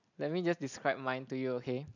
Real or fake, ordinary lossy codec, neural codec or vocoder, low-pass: real; none; none; 7.2 kHz